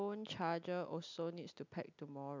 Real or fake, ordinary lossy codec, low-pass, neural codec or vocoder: real; MP3, 64 kbps; 7.2 kHz; none